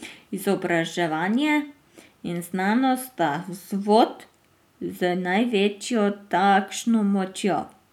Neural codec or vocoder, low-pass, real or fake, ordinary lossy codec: none; 19.8 kHz; real; none